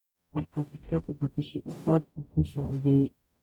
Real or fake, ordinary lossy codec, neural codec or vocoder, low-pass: fake; none; codec, 44.1 kHz, 0.9 kbps, DAC; 19.8 kHz